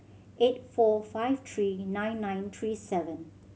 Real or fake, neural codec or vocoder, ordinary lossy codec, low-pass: real; none; none; none